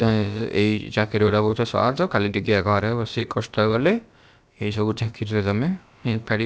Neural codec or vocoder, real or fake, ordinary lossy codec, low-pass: codec, 16 kHz, about 1 kbps, DyCAST, with the encoder's durations; fake; none; none